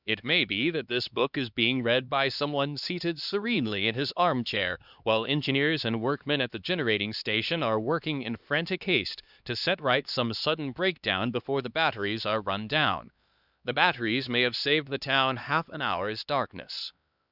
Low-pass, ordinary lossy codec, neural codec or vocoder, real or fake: 5.4 kHz; Opus, 64 kbps; codec, 16 kHz, 2 kbps, X-Codec, HuBERT features, trained on LibriSpeech; fake